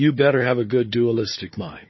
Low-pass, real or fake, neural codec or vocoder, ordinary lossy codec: 7.2 kHz; fake; codec, 16 kHz, 4 kbps, FunCodec, trained on Chinese and English, 50 frames a second; MP3, 24 kbps